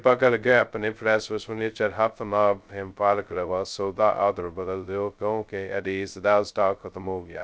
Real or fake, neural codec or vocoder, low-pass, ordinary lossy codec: fake; codec, 16 kHz, 0.2 kbps, FocalCodec; none; none